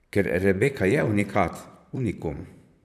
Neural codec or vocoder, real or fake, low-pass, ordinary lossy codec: vocoder, 44.1 kHz, 128 mel bands, Pupu-Vocoder; fake; 14.4 kHz; none